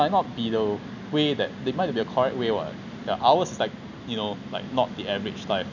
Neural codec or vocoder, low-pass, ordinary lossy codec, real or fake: none; 7.2 kHz; none; real